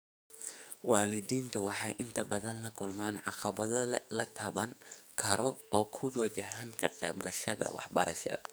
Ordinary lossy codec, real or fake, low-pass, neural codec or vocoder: none; fake; none; codec, 44.1 kHz, 2.6 kbps, SNAC